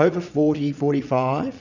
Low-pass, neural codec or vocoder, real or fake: 7.2 kHz; vocoder, 22.05 kHz, 80 mel bands, Vocos; fake